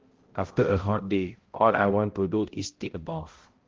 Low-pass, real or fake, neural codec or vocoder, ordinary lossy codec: 7.2 kHz; fake; codec, 16 kHz, 0.5 kbps, X-Codec, HuBERT features, trained on balanced general audio; Opus, 16 kbps